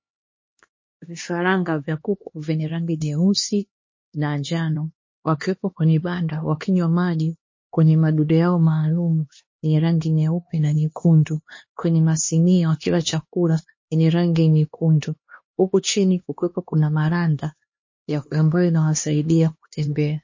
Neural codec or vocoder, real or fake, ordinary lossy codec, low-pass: codec, 16 kHz, 2 kbps, X-Codec, HuBERT features, trained on LibriSpeech; fake; MP3, 32 kbps; 7.2 kHz